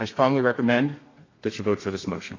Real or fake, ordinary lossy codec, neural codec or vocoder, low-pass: fake; AAC, 32 kbps; codec, 32 kHz, 1.9 kbps, SNAC; 7.2 kHz